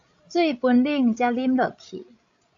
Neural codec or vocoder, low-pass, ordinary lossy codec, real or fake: codec, 16 kHz, 16 kbps, FreqCodec, larger model; 7.2 kHz; AAC, 64 kbps; fake